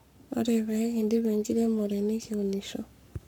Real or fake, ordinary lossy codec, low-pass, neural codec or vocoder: fake; none; 19.8 kHz; codec, 44.1 kHz, 7.8 kbps, Pupu-Codec